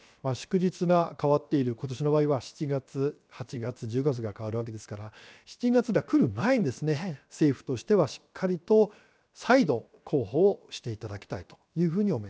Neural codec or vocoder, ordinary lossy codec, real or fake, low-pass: codec, 16 kHz, 0.7 kbps, FocalCodec; none; fake; none